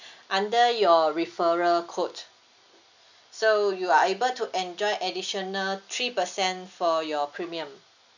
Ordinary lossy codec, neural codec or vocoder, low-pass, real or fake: none; none; 7.2 kHz; real